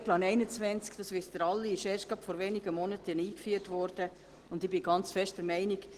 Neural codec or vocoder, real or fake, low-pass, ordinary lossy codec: none; real; 14.4 kHz; Opus, 16 kbps